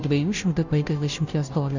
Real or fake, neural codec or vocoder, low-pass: fake; codec, 16 kHz, 0.5 kbps, FunCodec, trained on Chinese and English, 25 frames a second; 7.2 kHz